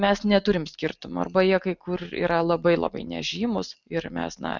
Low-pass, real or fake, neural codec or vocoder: 7.2 kHz; real; none